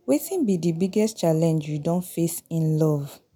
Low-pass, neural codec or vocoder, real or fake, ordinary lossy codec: none; none; real; none